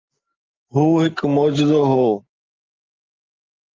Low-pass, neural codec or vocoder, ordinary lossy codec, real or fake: 7.2 kHz; none; Opus, 32 kbps; real